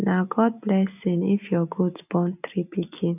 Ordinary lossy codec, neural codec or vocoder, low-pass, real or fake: none; none; 3.6 kHz; real